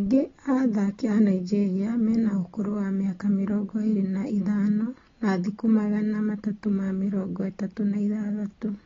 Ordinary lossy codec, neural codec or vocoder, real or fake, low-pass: AAC, 24 kbps; none; real; 7.2 kHz